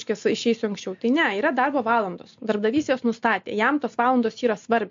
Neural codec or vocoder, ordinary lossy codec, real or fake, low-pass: none; MP3, 48 kbps; real; 7.2 kHz